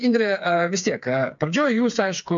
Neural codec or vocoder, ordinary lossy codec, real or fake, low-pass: codec, 16 kHz, 4 kbps, FreqCodec, smaller model; MP3, 64 kbps; fake; 7.2 kHz